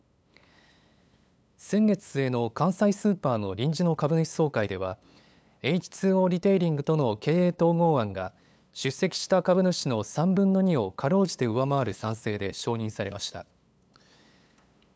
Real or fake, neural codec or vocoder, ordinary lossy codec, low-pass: fake; codec, 16 kHz, 8 kbps, FunCodec, trained on LibriTTS, 25 frames a second; none; none